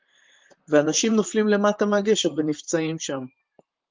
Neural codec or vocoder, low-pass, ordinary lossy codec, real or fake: vocoder, 44.1 kHz, 128 mel bands, Pupu-Vocoder; 7.2 kHz; Opus, 32 kbps; fake